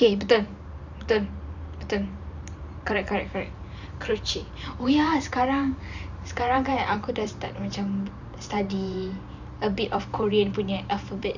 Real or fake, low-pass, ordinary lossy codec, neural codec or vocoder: fake; 7.2 kHz; none; vocoder, 44.1 kHz, 128 mel bands every 512 samples, BigVGAN v2